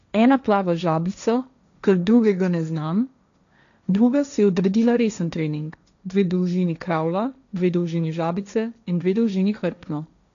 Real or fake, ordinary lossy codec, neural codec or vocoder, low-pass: fake; none; codec, 16 kHz, 1.1 kbps, Voila-Tokenizer; 7.2 kHz